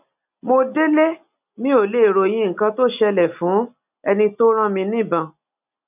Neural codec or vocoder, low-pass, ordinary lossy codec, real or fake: none; 3.6 kHz; none; real